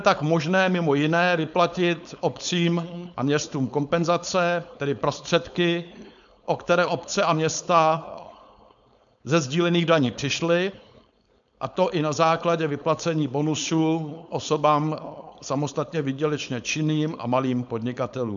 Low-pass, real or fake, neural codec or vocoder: 7.2 kHz; fake; codec, 16 kHz, 4.8 kbps, FACodec